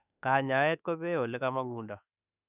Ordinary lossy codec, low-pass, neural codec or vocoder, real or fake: none; 3.6 kHz; autoencoder, 48 kHz, 32 numbers a frame, DAC-VAE, trained on Japanese speech; fake